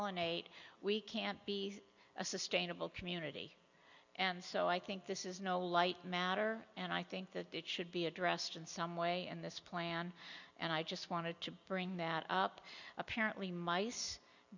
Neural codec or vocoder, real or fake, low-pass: none; real; 7.2 kHz